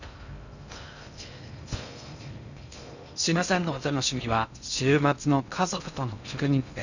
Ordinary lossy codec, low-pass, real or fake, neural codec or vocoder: none; 7.2 kHz; fake; codec, 16 kHz in and 24 kHz out, 0.6 kbps, FocalCodec, streaming, 2048 codes